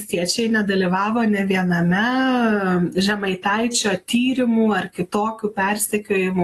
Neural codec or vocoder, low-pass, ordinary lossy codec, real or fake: none; 14.4 kHz; AAC, 48 kbps; real